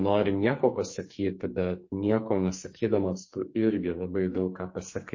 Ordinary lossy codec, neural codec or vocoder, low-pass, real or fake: MP3, 32 kbps; codec, 44.1 kHz, 2.6 kbps, SNAC; 7.2 kHz; fake